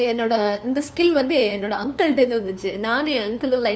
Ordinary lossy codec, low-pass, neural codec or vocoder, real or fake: none; none; codec, 16 kHz, 2 kbps, FunCodec, trained on LibriTTS, 25 frames a second; fake